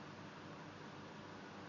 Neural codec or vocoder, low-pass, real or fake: none; 7.2 kHz; real